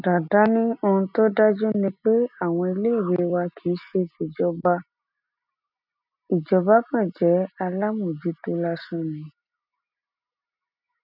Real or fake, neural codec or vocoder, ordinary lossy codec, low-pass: real; none; none; 5.4 kHz